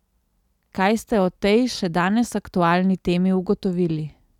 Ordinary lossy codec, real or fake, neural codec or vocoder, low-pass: none; real; none; 19.8 kHz